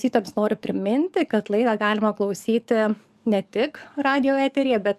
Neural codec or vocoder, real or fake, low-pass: codec, 44.1 kHz, 7.8 kbps, DAC; fake; 14.4 kHz